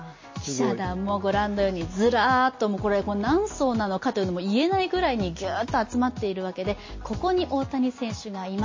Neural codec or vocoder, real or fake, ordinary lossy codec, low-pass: none; real; MP3, 32 kbps; 7.2 kHz